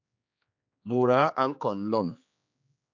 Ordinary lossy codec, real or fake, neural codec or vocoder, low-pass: MP3, 64 kbps; fake; codec, 16 kHz, 2 kbps, X-Codec, HuBERT features, trained on general audio; 7.2 kHz